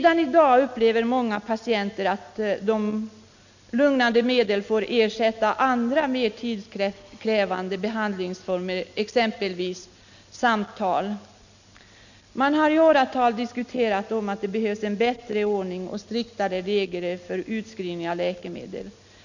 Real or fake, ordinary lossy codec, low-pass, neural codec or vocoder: real; none; 7.2 kHz; none